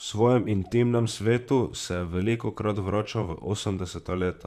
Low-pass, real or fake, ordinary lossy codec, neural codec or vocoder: 14.4 kHz; fake; none; vocoder, 44.1 kHz, 128 mel bands, Pupu-Vocoder